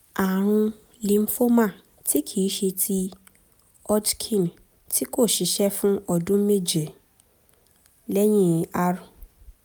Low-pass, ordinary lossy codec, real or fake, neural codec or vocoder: none; none; real; none